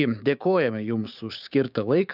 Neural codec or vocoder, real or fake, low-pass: codec, 16 kHz, 6 kbps, DAC; fake; 5.4 kHz